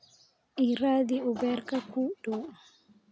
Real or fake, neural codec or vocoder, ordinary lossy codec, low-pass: real; none; none; none